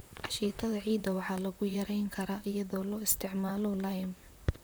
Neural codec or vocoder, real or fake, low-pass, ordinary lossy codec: vocoder, 44.1 kHz, 128 mel bands, Pupu-Vocoder; fake; none; none